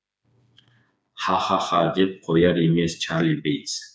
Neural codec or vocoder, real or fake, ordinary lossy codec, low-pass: codec, 16 kHz, 4 kbps, FreqCodec, smaller model; fake; none; none